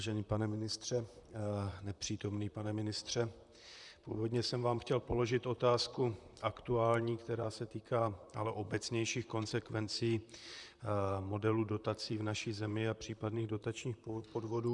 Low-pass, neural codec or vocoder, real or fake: 10.8 kHz; vocoder, 44.1 kHz, 128 mel bands, Pupu-Vocoder; fake